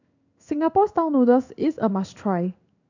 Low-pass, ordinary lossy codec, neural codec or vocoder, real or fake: 7.2 kHz; none; codec, 16 kHz in and 24 kHz out, 1 kbps, XY-Tokenizer; fake